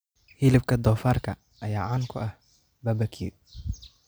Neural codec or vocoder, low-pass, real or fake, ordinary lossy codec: none; none; real; none